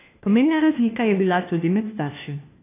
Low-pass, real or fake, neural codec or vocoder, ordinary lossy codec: 3.6 kHz; fake; codec, 16 kHz, 1 kbps, FunCodec, trained on LibriTTS, 50 frames a second; AAC, 24 kbps